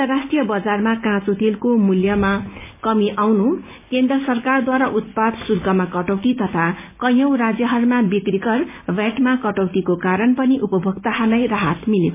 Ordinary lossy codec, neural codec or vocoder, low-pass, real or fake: MP3, 32 kbps; none; 3.6 kHz; real